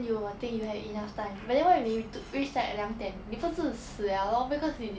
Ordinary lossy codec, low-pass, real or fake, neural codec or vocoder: none; none; real; none